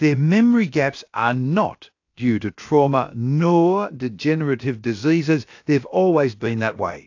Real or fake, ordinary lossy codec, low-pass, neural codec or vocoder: fake; AAC, 48 kbps; 7.2 kHz; codec, 16 kHz, about 1 kbps, DyCAST, with the encoder's durations